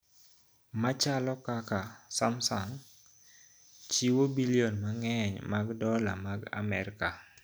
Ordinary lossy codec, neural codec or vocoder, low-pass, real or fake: none; none; none; real